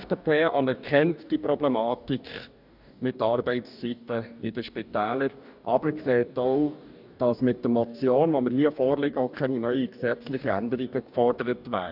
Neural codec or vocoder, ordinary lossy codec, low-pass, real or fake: codec, 44.1 kHz, 2.6 kbps, DAC; none; 5.4 kHz; fake